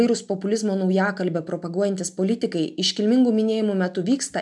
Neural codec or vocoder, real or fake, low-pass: none; real; 10.8 kHz